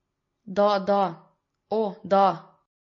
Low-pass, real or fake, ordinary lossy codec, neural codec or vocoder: 7.2 kHz; real; MP3, 32 kbps; none